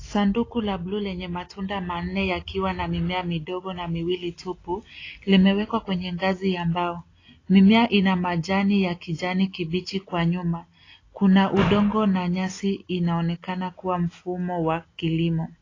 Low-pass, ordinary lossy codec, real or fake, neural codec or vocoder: 7.2 kHz; AAC, 32 kbps; real; none